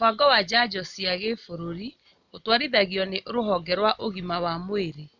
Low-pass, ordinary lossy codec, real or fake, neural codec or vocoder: 7.2 kHz; none; real; none